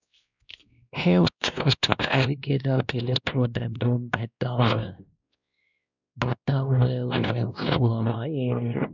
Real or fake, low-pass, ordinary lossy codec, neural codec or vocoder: fake; 7.2 kHz; none; codec, 16 kHz, 1 kbps, X-Codec, WavLM features, trained on Multilingual LibriSpeech